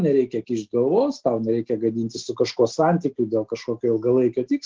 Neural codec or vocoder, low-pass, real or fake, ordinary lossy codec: none; 7.2 kHz; real; Opus, 16 kbps